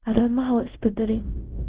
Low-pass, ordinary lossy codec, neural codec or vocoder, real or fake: 3.6 kHz; Opus, 16 kbps; codec, 24 kHz, 0.5 kbps, DualCodec; fake